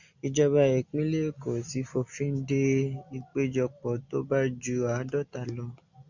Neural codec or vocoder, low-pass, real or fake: none; 7.2 kHz; real